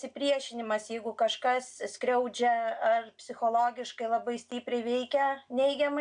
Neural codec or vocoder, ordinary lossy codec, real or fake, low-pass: none; AAC, 64 kbps; real; 9.9 kHz